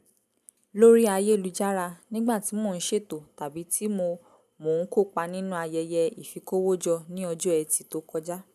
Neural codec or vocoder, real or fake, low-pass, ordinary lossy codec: none; real; 14.4 kHz; none